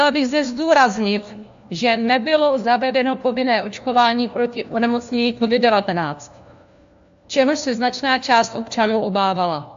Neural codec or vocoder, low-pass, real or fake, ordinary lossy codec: codec, 16 kHz, 1 kbps, FunCodec, trained on LibriTTS, 50 frames a second; 7.2 kHz; fake; AAC, 64 kbps